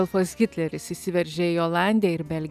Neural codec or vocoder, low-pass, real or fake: none; 14.4 kHz; real